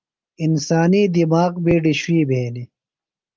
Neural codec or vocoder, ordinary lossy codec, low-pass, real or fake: none; Opus, 32 kbps; 7.2 kHz; real